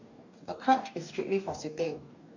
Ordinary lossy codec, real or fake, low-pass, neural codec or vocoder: none; fake; 7.2 kHz; codec, 44.1 kHz, 2.6 kbps, DAC